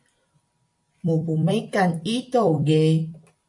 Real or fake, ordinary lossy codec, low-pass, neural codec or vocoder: fake; AAC, 64 kbps; 10.8 kHz; vocoder, 44.1 kHz, 128 mel bands every 256 samples, BigVGAN v2